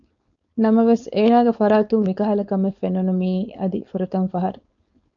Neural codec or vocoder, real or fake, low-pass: codec, 16 kHz, 4.8 kbps, FACodec; fake; 7.2 kHz